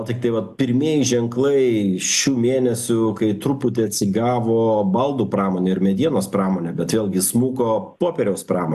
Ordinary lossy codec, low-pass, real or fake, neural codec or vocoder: AAC, 96 kbps; 14.4 kHz; real; none